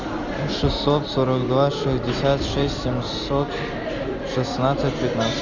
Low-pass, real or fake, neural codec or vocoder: 7.2 kHz; real; none